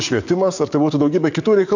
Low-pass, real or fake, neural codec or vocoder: 7.2 kHz; fake; codec, 44.1 kHz, 7.8 kbps, DAC